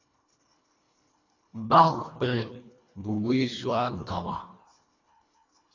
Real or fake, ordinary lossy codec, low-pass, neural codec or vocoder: fake; AAC, 32 kbps; 7.2 kHz; codec, 24 kHz, 1.5 kbps, HILCodec